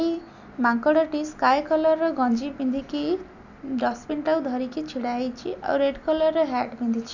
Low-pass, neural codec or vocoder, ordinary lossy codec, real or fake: 7.2 kHz; none; none; real